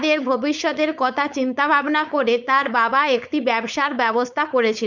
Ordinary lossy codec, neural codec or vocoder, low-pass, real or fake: none; codec, 16 kHz, 4.8 kbps, FACodec; 7.2 kHz; fake